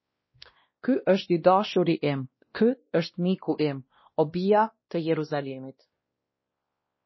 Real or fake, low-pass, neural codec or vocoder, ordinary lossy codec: fake; 7.2 kHz; codec, 16 kHz, 1 kbps, X-Codec, WavLM features, trained on Multilingual LibriSpeech; MP3, 24 kbps